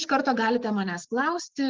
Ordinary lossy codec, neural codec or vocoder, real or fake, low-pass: Opus, 32 kbps; none; real; 7.2 kHz